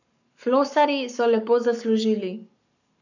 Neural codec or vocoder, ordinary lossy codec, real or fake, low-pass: codec, 44.1 kHz, 7.8 kbps, Pupu-Codec; none; fake; 7.2 kHz